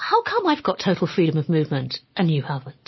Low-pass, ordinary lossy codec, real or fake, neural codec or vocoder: 7.2 kHz; MP3, 24 kbps; real; none